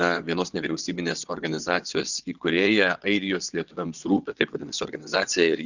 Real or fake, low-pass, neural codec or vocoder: fake; 7.2 kHz; vocoder, 22.05 kHz, 80 mel bands, WaveNeXt